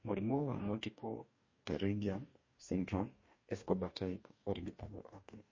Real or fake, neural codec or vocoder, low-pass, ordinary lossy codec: fake; codec, 44.1 kHz, 2.6 kbps, DAC; 7.2 kHz; MP3, 32 kbps